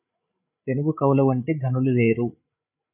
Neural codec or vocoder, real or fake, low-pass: codec, 16 kHz, 8 kbps, FreqCodec, larger model; fake; 3.6 kHz